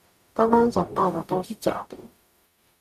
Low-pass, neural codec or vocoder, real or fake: 14.4 kHz; codec, 44.1 kHz, 0.9 kbps, DAC; fake